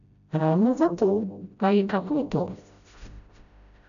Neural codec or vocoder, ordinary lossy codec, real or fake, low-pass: codec, 16 kHz, 0.5 kbps, FreqCodec, smaller model; none; fake; 7.2 kHz